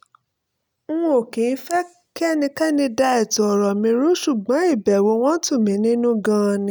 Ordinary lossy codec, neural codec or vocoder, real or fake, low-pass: none; none; real; none